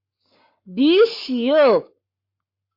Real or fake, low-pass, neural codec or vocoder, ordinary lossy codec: fake; 5.4 kHz; codec, 44.1 kHz, 7.8 kbps, Pupu-Codec; MP3, 32 kbps